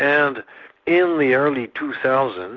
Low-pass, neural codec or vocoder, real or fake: 7.2 kHz; none; real